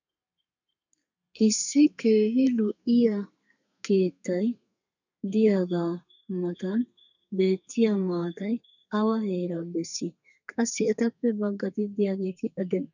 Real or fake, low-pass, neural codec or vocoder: fake; 7.2 kHz; codec, 32 kHz, 1.9 kbps, SNAC